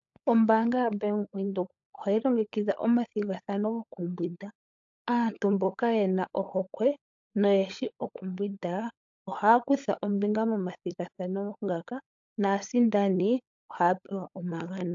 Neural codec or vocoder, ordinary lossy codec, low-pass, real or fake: codec, 16 kHz, 16 kbps, FunCodec, trained on LibriTTS, 50 frames a second; AAC, 64 kbps; 7.2 kHz; fake